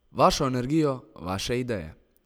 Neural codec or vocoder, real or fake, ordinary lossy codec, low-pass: none; real; none; none